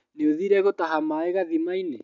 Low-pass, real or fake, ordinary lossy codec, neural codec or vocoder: 7.2 kHz; real; none; none